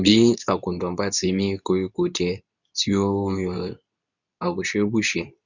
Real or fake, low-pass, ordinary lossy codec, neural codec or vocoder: fake; 7.2 kHz; none; codec, 24 kHz, 0.9 kbps, WavTokenizer, medium speech release version 2